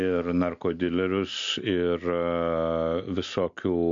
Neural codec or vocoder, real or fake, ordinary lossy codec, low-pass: none; real; MP3, 48 kbps; 7.2 kHz